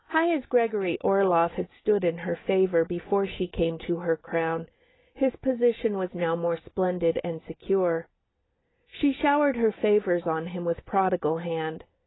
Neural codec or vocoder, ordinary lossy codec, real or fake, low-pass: none; AAC, 16 kbps; real; 7.2 kHz